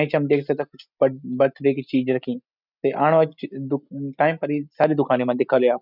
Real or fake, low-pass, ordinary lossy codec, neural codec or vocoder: real; 5.4 kHz; none; none